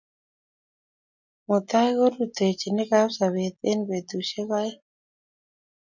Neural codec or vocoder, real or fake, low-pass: none; real; 7.2 kHz